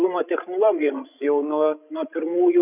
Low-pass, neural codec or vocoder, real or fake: 3.6 kHz; codec, 16 kHz, 16 kbps, FreqCodec, larger model; fake